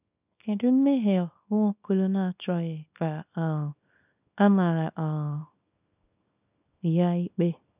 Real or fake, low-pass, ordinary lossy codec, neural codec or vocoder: fake; 3.6 kHz; none; codec, 24 kHz, 0.9 kbps, WavTokenizer, small release